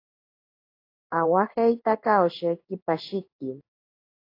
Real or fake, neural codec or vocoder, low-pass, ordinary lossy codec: fake; codec, 16 kHz in and 24 kHz out, 1 kbps, XY-Tokenizer; 5.4 kHz; AAC, 32 kbps